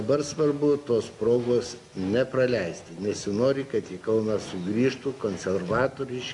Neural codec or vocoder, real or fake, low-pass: none; real; 10.8 kHz